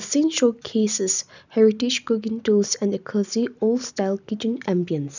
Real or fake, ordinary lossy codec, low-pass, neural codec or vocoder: real; none; 7.2 kHz; none